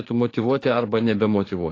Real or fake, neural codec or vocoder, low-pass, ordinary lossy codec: fake; codec, 44.1 kHz, 7.8 kbps, DAC; 7.2 kHz; AAC, 32 kbps